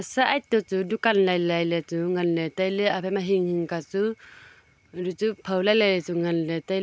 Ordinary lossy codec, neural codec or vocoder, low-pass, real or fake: none; none; none; real